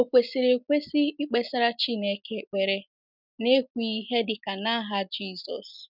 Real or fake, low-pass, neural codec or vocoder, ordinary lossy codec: real; 5.4 kHz; none; none